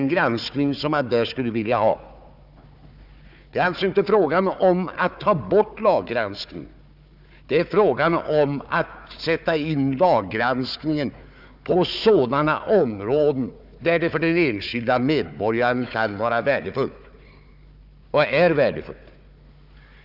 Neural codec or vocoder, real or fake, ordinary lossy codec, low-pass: codec, 16 kHz, 4 kbps, FunCodec, trained on Chinese and English, 50 frames a second; fake; none; 5.4 kHz